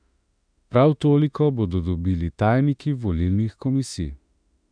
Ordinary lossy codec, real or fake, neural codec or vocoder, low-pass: none; fake; autoencoder, 48 kHz, 32 numbers a frame, DAC-VAE, trained on Japanese speech; 9.9 kHz